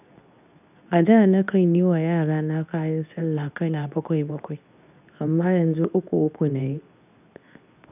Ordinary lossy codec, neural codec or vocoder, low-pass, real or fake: none; codec, 24 kHz, 0.9 kbps, WavTokenizer, medium speech release version 2; 3.6 kHz; fake